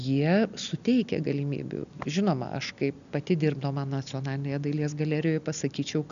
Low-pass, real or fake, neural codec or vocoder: 7.2 kHz; real; none